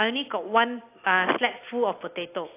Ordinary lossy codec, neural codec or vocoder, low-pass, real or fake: AAC, 24 kbps; none; 3.6 kHz; real